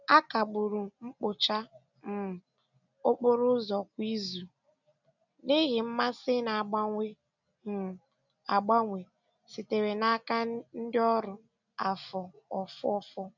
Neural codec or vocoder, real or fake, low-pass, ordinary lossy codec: none; real; 7.2 kHz; none